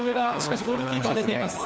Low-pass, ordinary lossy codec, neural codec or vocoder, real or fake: none; none; codec, 16 kHz, 4 kbps, FunCodec, trained on LibriTTS, 50 frames a second; fake